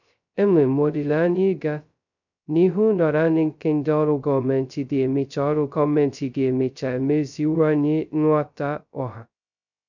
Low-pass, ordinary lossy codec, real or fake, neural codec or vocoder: 7.2 kHz; none; fake; codec, 16 kHz, 0.2 kbps, FocalCodec